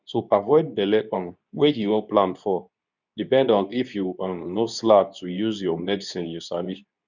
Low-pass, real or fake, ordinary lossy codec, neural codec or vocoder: 7.2 kHz; fake; none; codec, 24 kHz, 0.9 kbps, WavTokenizer, medium speech release version 2